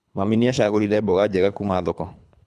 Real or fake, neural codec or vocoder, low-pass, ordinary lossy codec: fake; codec, 24 kHz, 3 kbps, HILCodec; none; none